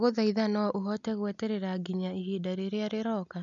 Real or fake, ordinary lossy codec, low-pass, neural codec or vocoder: real; none; 7.2 kHz; none